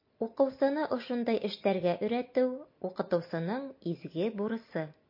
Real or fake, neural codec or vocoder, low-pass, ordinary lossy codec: real; none; 5.4 kHz; MP3, 32 kbps